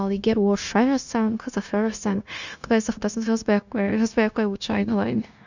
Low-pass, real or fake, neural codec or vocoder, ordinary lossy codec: 7.2 kHz; fake; codec, 16 kHz, 0.9 kbps, LongCat-Audio-Codec; none